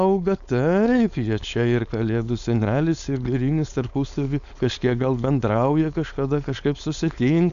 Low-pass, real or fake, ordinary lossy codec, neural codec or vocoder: 7.2 kHz; fake; MP3, 96 kbps; codec, 16 kHz, 4.8 kbps, FACodec